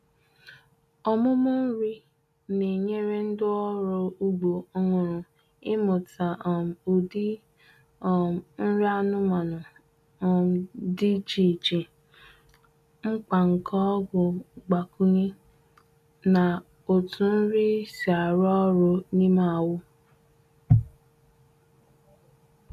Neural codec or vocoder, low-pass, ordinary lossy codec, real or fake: none; 14.4 kHz; none; real